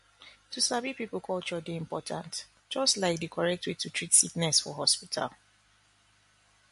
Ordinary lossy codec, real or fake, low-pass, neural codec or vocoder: MP3, 48 kbps; real; 14.4 kHz; none